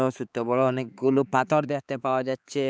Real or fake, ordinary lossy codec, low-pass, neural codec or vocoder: fake; none; none; codec, 16 kHz, 4 kbps, X-Codec, HuBERT features, trained on balanced general audio